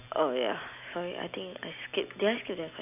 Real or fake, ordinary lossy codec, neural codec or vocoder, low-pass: real; AAC, 32 kbps; none; 3.6 kHz